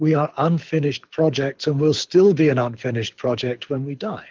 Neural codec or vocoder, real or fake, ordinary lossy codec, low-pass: codec, 24 kHz, 6 kbps, HILCodec; fake; Opus, 32 kbps; 7.2 kHz